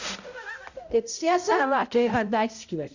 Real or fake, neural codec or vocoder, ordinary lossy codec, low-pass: fake; codec, 16 kHz, 0.5 kbps, X-Codec, HuBERT features, trained on balanced general audio; Opus, 64 kbps; 7.2 kHz